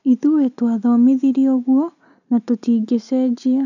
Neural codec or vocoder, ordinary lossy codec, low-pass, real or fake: none; none; 7.2 kHz; real